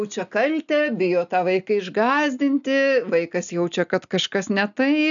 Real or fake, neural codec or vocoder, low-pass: real; none; 7.2 kHz